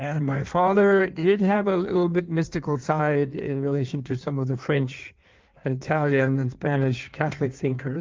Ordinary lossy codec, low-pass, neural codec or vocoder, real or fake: Opus, 32 kbps; 7.2 kHz; codec, 16 kHz in and 24 kHz out, 1.1 kbps, FireRedTTS-2 codec; fake